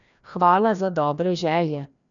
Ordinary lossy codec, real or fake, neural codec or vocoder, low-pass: none; fake; codec, 16 kHz, 1 kbps, FreqCodec, larger model; 7.2 kHz